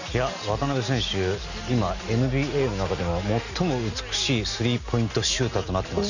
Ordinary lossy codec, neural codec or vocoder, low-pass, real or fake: none; none; 7.2 kHz; real